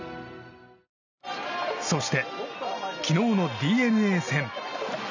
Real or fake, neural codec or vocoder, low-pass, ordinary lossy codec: real; none; 7.2 kHz; none